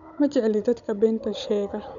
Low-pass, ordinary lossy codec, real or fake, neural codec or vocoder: 7.2 kHz; none; fake; codec, 16 kHz, 16 kbps, FunCodec, trained on Chinese and English, 50 frames a second